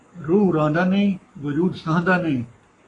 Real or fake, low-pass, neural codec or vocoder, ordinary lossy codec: fake; 10.8 kHz; codec, 44.1 kHz, 7.8 kbps, Pupu-Codec; AAC, 32 kbps